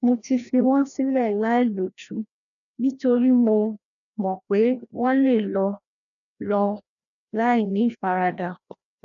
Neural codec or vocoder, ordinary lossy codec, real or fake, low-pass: codec, 16 kHz, 1 kbps, FreqCodec, larger model; none; fake; 7.2 kHz